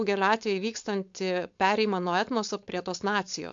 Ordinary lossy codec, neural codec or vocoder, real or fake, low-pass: AAC, 64 kbps; codec, 16 kHz, 4.8 kbps, FACodec; fake; 7.2 kHz